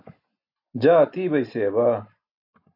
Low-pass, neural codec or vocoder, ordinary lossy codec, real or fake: 5.4 kHz; none; AAC, 48 kbps; real